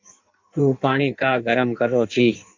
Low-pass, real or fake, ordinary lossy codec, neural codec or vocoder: 7.2 kHz; fake; MP3, 64 kbps; codec, 16 kHz in and 24 kHz out, 1.1 kbps, FireRedTTS-2 codec